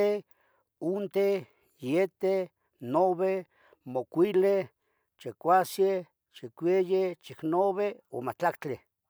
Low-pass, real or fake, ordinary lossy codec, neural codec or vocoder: none; real; none; none